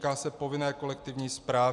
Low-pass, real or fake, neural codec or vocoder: 10.8 kHz; real; none